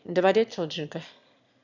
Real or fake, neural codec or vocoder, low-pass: fake; autoencoder, 22.05 kHz, a latent of 192 numbers a frame, VITS, trained on one speaker; 7.2 kHz